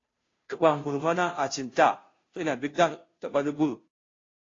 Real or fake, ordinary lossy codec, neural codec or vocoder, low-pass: fake; AAC, 32 kbps; codec, 16 kHz, 0.5 kbps, FunCodec, trained on Chinese and English, 25 frames a second; 7.2 kHz